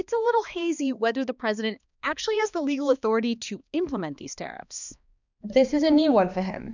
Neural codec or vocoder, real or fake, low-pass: codec, 16 kHz, 2 kbps, X-Codec, HuBERT features, trained on balanced general audio; fake; 7.2 kHz